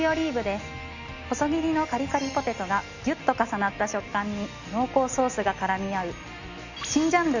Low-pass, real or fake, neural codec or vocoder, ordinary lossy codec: 7.2 kHz; real; none; none